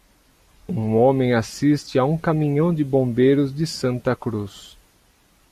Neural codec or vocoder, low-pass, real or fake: none; 14.4 kHz; real